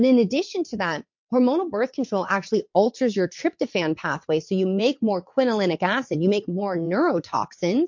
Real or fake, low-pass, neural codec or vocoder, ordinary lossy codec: fake; 7.2 kHz; vocoder, 22.05 kHz, 80 mel bands, WaveNeXt; MP3, 48 kbps